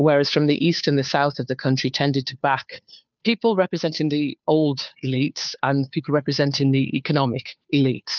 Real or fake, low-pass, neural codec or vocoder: fake; 7.2 kHz; codec, 16 kHz, 2 kbps, FunCodec, trained on Chinese and English, 25 frames a second